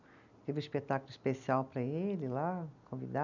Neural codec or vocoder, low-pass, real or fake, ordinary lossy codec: none; 7.2 kHz; real; none